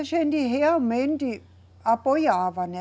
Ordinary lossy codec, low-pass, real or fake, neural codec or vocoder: none; none; real; none